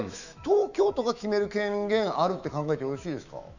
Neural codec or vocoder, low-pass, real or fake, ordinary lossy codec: autoencoder, 48 kHz, 128 numbers a frame, DAC-VAE, trained on Japanese speech; 7.2 kHz; fake; none